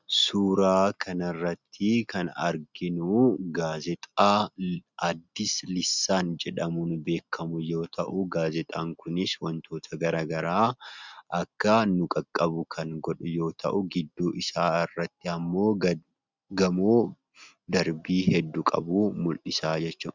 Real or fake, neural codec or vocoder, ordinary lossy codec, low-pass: real; none; Opus, 64 kbps; 7.2 kHz